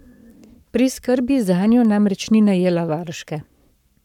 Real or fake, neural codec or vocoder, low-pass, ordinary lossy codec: fake; codec, 44.1 kHz, 7.8 kbps, Pupu-Codec; 19.8 kHz; none